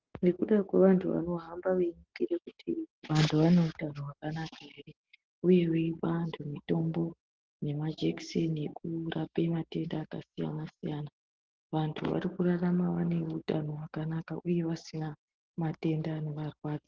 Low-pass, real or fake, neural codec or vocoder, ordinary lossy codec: 7.2 kHz; real; none; Opus, 16 kbps